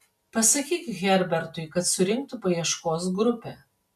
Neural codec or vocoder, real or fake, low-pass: none; real; 14.4 kHz